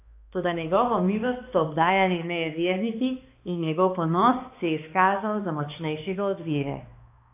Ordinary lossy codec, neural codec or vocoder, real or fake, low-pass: AAC, 32 kbps; codec, 16 kHz, 2 kbps, X-Codec, HuBERT features, trained on balanced general audio; fake; 3.6 kHz